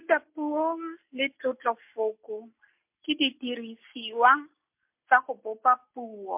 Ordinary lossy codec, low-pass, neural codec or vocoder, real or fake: MP3, 32 kbps; 3.6 kHz; none; real